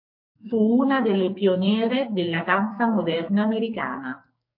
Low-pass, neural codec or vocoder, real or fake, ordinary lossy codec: 5.4 kHz; codec, 44.1 kHz, 2.6 kbps, SNAC; fake; MP3, 32 kbps